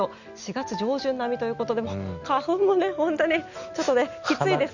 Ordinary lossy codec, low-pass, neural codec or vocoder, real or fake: none; 7.2 kHz; none; real